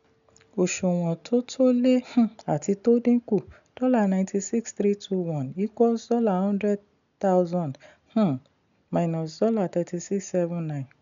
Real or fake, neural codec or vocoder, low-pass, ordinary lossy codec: real; none; 7.2 kHz; none